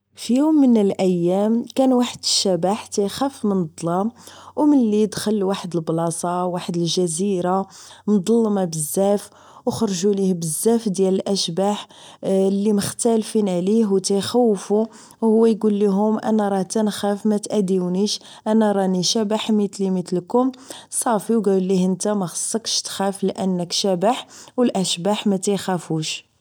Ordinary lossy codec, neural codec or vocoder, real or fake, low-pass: none; none; real; none